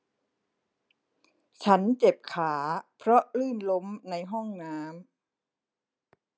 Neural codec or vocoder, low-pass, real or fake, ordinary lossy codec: none; none; real; none